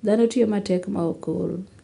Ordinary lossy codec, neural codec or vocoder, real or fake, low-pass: none; none; real; 10.8 kHz